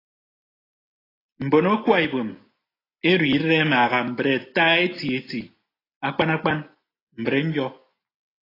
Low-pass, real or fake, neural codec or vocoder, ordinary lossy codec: 5.4 kHz; real; none; AAC, 24 kbps